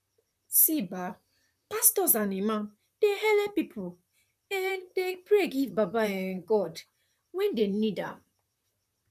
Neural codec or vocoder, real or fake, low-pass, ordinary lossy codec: vocoder, 44.1 kHz, 128 mel bands, Pupu-Vocoder; fake; 14.4 kHz; none